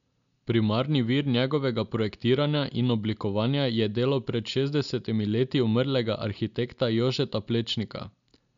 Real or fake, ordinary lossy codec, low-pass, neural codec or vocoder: real; none; 7.2 kHz; none